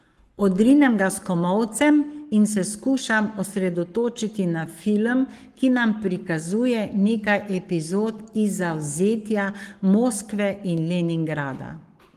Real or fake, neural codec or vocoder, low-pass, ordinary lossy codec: fake; codec, 44.1 kHz, 7.8 kbps, Pupu-Codec; 14.4 kHz; Opus, 24 kbps